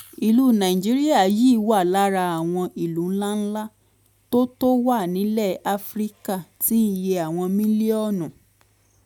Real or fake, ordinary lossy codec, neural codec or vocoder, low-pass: real; none; none; 19.8 kHz